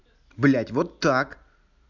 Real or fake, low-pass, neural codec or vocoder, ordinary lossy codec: real; 7.2 kHz; none; none